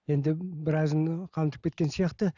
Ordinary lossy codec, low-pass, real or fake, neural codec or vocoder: none; 7.2 kHz; real; none